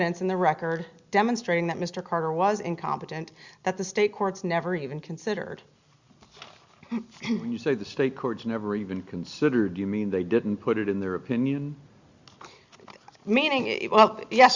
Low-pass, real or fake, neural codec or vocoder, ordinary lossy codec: 7.2 kHz; real; none; Opus, 64 kbps